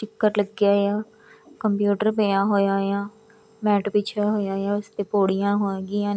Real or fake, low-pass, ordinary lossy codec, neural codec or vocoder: real; none; none; none